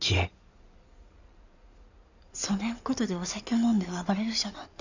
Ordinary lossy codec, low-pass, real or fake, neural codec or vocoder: none; 7.2 kHz; fake; codec, 16 kHz in and 24 kHz out, 2.2 kbps, FireRedTTS-2 codec